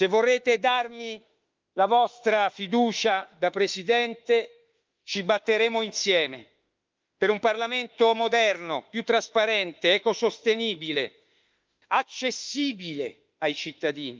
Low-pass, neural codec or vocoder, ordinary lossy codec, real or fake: 7.2 kHz; autoencoder, 48 kHz, 32 numbers a frame, DAC-VAE, trained on Japanese speech; Opus, 24 kbps; fake